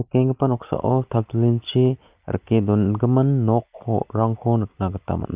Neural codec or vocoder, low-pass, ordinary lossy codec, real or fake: none; 3.6 kHz; Opus, 24 kbps; real